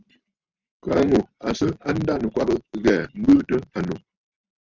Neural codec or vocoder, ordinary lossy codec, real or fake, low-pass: none; Opus, 64 kbps; real; 7.2 kHz